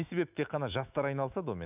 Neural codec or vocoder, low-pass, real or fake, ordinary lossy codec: autoencoder, 48 kHz, 128 numbers a frame, DAC-VAE, trained on Japanese speech; 3.6 kHz; fake; none